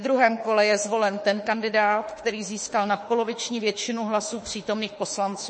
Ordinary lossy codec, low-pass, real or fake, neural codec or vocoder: MP3, 32 kbps; 9.9 kHz; fake; autoencoder, 48 kHz, 32 numbers a frame, DAC-VAE, trained on Japanese speech